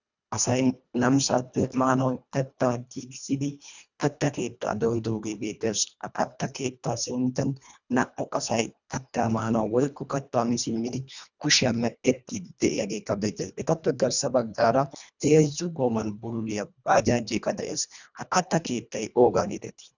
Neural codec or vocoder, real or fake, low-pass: codec, 24 kHz, 1.5 kbps, HILCodec; fake; 7.2 kHz